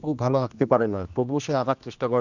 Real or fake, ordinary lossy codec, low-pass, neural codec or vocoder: fake; none; 7.2 kHz; codec, 16 kHz, 1 kbps, X-Codec, HuBERT features, trained on general audio